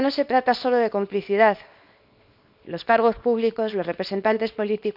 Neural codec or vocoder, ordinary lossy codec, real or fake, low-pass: codec, 24 kHz, 0.9 kbps, WavTokenizer, small release; none; fake; 5.4 kHz